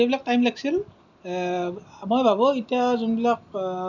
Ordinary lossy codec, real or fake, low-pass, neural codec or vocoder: none; real; 7.2 kHz; none